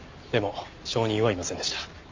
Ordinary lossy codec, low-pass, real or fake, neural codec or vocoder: MP3, 48 kbps; 7.2 kHz; real; none